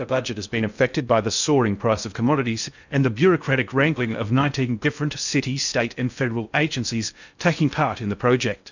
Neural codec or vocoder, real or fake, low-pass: codec, 16 kHz in and 24 kHz out, 0.6 kbps, FocalCodec, streaming, 2048 codes; fake; 7.2 kHz